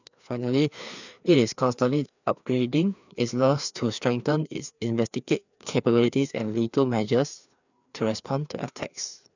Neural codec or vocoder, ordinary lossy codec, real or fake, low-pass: codec, 16 kHz, 2 kbps, FreqCodec, larger model; none; fake; 7.2 kHz